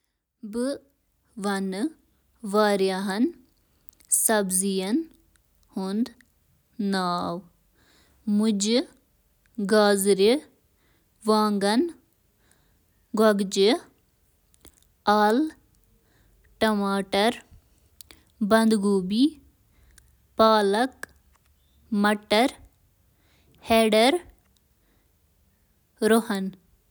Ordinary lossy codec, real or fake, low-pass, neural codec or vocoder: none; real; none; none